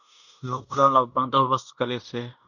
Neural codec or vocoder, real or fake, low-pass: codec, 16 kHz in and 24 kHz out, 0.9 kbps, LongCat-Audio-Codec, fine tuned four codebook decoder; fake; 7.2 kHz